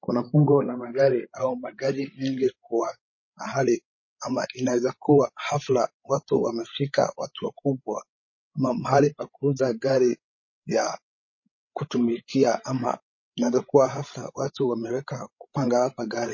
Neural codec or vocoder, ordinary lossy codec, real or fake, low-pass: codec, 16 kHz, 16 kbps, FreqCodec, larger model; MP3, 32 kbps; fake; 7.2 kHz